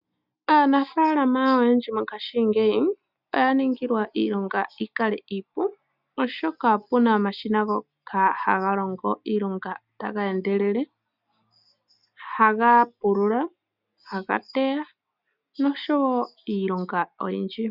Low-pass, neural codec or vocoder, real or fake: 5.4 kHz; none; real